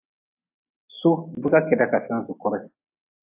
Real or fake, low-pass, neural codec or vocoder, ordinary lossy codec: real; 3.6 kHz; none; AAC, 24 kbps